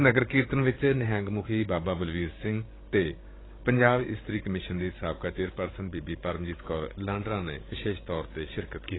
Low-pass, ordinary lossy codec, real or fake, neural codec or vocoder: 7.2 kHz; AAC, 16 kbps; fake; codec, 16 kHz, 16 kbps, FreqCodec, larger model